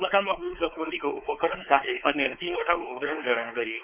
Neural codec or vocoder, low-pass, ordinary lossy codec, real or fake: codec, 16 kHz, 4 kbps, X-Codec, WavLM features, trained on Multilingual LibriSpeech; 3.6 kHz; none; fake